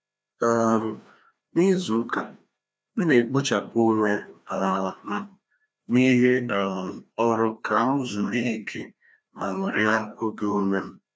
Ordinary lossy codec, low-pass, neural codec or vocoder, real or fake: none; none; codec, 16 kHz, 1 kbps, FreqCodec, larger model; fake